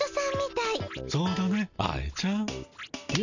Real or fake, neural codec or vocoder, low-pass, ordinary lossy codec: real; none; 7.2 kHz; none